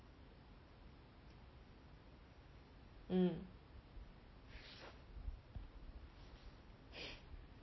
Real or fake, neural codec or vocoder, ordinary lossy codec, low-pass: real; none; MP3, 24 kbps; 7.2 kHz